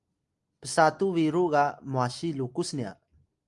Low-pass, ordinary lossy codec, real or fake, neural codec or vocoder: 10.8 kHz; Opus, 32 kbps; real; none